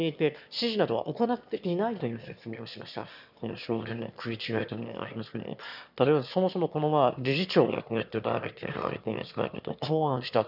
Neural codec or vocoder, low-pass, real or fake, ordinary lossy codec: autoencoder, 22.05 kHz, a latent of 192 numbers a frame, VITS, trained on one speaker; 5.4 kHz; fake; none